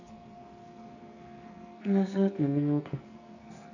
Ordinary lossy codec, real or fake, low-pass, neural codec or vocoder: none; fake; 7.2 kHz; codec, 44.1 kHz, 2.6 kbps, SNAC